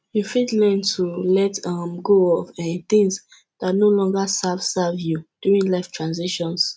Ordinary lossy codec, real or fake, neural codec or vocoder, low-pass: none; real; none; none